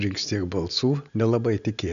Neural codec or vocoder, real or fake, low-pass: none; real; 7.2 kHz